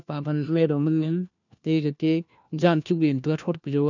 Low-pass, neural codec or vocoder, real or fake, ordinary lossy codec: 7.2 kHz; codec, 16 kHz, 1 kbps, FunCodec, trained on LibriTTS, 50 frames a second; fake; none